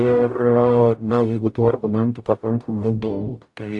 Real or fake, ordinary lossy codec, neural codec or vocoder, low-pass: fake; AAC, 64 kbps; codec, 44.1 kHz, 0.9 kbps, DAC; 10.8 kHz